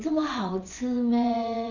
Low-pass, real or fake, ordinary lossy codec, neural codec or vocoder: 7.2 kHz; fake; none; vocoder, 22.05 kHz, 80 mel bands, WaveNeXt